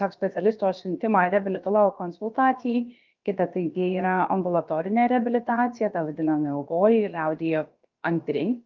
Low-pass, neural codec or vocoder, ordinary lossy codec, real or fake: 7.2 kHz; codec, 16 kHz, about 1 kbps, DyCAST, with the encoder's durations; Opus, 32 kbps; fake